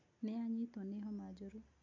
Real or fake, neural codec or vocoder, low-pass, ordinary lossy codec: real; none; 7.2 kHz; MP3, 48 kbps